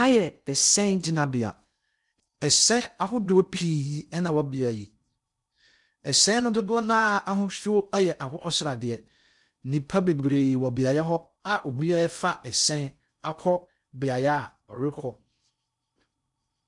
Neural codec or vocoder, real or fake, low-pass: codec, 16 kHz in and 24 kHz out, 0.6 kbps, FocalCodec, streaming, 2048 codes; fake; 10.8 kHz